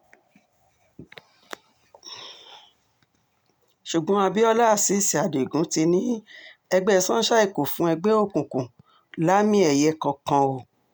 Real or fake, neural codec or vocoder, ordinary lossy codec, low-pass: real; none; none; none